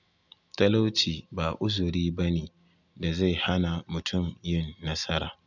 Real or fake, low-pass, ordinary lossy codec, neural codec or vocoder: real; 7.2 kHz; none; none